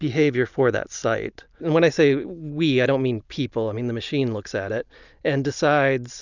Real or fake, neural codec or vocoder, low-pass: real; none; 7.2 kHz